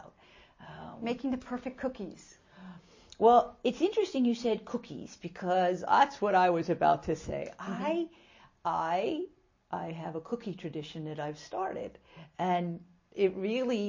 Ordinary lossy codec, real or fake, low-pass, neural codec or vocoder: MP3, 32 kbps; real; 7.2 kHz; none